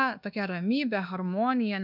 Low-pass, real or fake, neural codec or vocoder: 5.4 kHz; fake; codec, 24 kHz, 1.2 kbps, DualCodec